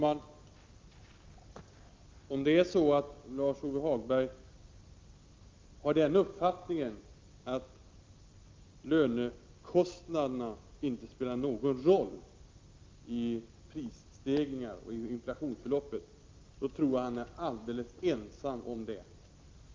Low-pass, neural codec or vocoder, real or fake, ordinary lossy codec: 7.2 kHz; none; real; Opus, 32 kbps